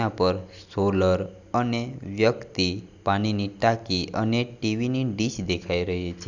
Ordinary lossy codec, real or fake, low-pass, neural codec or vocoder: none; real; 7.2 kHz; none